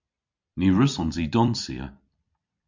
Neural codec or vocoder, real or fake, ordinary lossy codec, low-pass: vocoder, 44.1 kHz, 128 mel bands every 256 samples, BigVGAN v2; fake; MP3, 64 kbps; 7.2 kHz